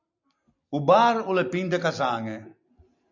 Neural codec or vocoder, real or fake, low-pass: none; real; 7.2 kHz